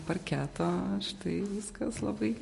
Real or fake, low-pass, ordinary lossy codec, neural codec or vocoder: real; 14.4 kHz; MP3, 48 kbps; none